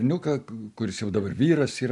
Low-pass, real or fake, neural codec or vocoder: 10.8 kHz; real; none